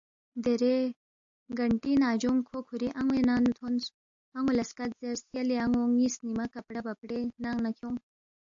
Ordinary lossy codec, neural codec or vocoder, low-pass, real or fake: AAC, 64 kbps; none; 7.2 kHz; real